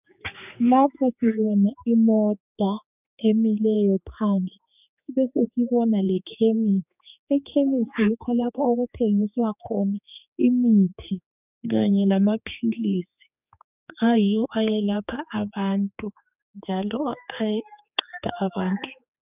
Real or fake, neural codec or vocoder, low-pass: fake; codec, 16 kHz, 4 kbps, X-Codec, HuBERT features, trained on general audio; 3.6 kHz